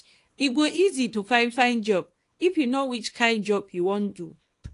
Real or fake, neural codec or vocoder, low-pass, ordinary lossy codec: fake; codec, 24 kHz, 0.9 kbps, WavTokenizer, small release; 10.8 kHz; AAC, 48 kbps